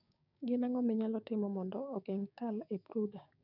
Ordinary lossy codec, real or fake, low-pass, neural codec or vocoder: none; fake; 5.4 kHz; codec, 16 kHz, 6 kbps, DAC